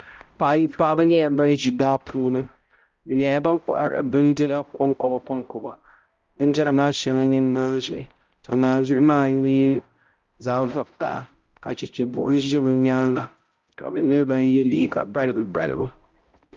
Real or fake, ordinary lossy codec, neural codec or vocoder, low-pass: fake; Opus, 32 kbps; codec, 16 kHz, 0.5 kbps, X-Codec, HuBERT features, trained on balanced general audio; 7.2 kHz